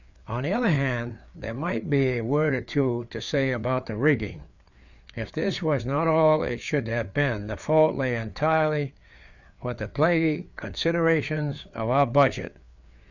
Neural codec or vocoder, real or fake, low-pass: codec, 16 kHz, 4 kbps, FreqCodec, larger model; fake; 7.2 kHz